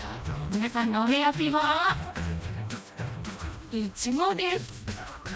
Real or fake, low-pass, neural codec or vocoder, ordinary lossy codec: fake; none; codec, 16 kHz, 1 kbps, FreqCodec, smaller model; none